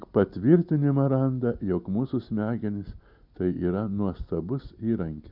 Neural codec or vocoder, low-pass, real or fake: none; 5.4 kHz; real